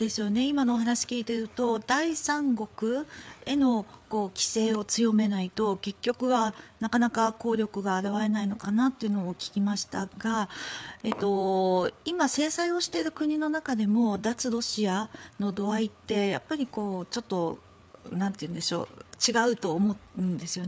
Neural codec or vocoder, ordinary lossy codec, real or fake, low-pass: codec, 16 kHz, 4 kbps, FreqCodec, larger model; none; fake; none